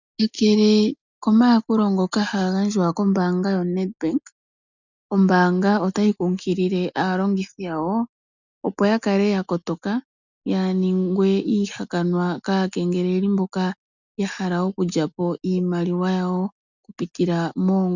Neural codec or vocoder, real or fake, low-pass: none; real; 7.2 kHz